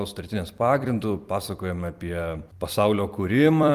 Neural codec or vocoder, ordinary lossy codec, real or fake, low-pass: vocoder, 44.1 kHz, 128 mel bands every 256 samples, BigVGAN v2; Opus, 32 kbps; fake; 14.4 kHz